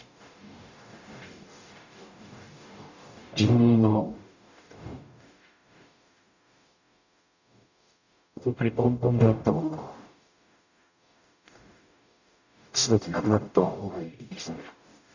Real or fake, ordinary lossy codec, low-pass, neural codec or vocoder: fake; none; 7.2 kHz; codec, 44.1 kHz, 0.9 kbps, DAC